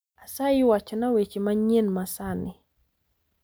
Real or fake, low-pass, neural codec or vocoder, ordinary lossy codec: real; none; none; none